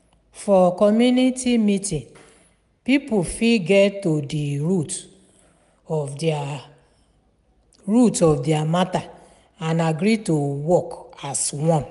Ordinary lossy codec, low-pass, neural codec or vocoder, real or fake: none; 10.8 kHz; none; real